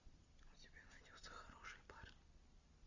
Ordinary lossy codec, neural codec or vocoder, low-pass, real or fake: Opus, 64 kbps; none; 7.2 kHz; real